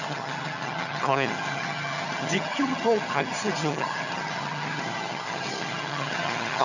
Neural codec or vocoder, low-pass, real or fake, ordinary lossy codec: vocoder, 22.05 kHz, 80 mel bands, HiFi-GAN; 7.2 kHz; fake; none